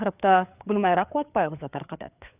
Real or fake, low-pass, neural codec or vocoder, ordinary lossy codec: fake; 3.6 kHz; codec, 16 kHz, 4 kbps, FreqCodec, larger model; none